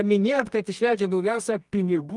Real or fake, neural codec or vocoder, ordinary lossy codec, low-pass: fake; codec, 24 kHz, 0.9 kbps, WavTokenizer, medium music audio release; Opus, 32 kbps; 10.8 kHz